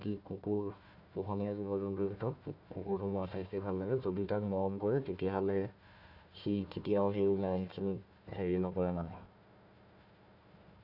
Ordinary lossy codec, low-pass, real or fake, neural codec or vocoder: none; 5.4 kHz; fake; codec, 16 kHz, 1 kbps, FunCodec, trained on Chinese and English, 50 frames a second